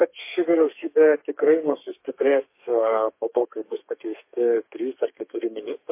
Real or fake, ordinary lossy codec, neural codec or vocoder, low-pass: fake; MP3, 24 kbps; codec, 32 kHz, 1.9 kbps, SNAC; 3.6 kHz